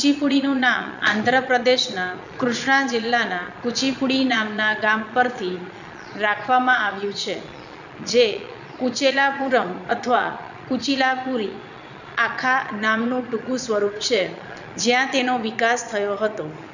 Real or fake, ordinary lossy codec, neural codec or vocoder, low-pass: fake; none; vocoder, 22.05 kHz, 80 mel bands, Vocos; 7.2 kHz